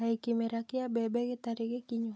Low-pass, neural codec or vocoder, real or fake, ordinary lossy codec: none; none; real; none